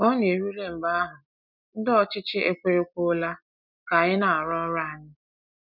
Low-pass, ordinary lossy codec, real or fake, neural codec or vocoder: 5.4 kHz; none; real; none